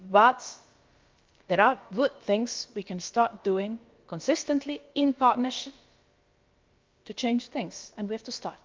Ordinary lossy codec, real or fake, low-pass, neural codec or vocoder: Opus, 24 kbps; fake; 7.2 kHz; codec, 16 kHz, about 1 kbps, DyCAST, with the encoder's durations